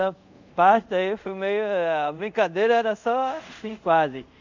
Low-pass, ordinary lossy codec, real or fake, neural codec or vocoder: 7.2 kHz; none; fake; codec, 24 kHz, 0.5 kbps, DualCodec